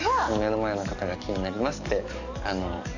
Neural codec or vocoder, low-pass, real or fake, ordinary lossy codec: codec, 44.1 kHz, 7.8 kbps, DAC; 7.2 kHz; fake; none